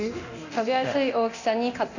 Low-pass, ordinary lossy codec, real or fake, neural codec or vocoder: 7.2 kHz; none; fake; codec, 24 kHz, 0.9 kbps, DualCodec